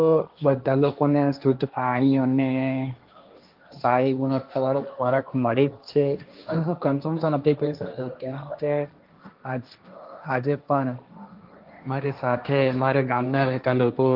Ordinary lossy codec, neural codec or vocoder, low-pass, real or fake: Opus, 32 kbps; codec, 16 kHz, 1.1 kbps, Voila-Tokenizer; 5.4 kHz; fake